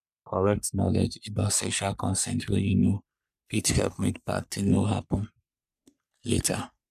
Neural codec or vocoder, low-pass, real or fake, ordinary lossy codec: codec, 44.1 kHz, 3.4 kbps, Pupu-Codec; 14.4 kHz; fake; none